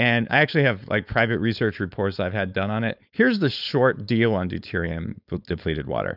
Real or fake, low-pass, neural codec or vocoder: fake; 5.4 kHz; codec, 16 kHz, 4.8 kbps, FACodec